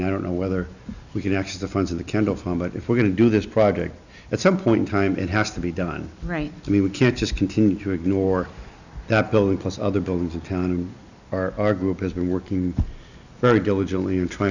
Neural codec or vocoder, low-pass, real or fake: none; 7.2 kHz; real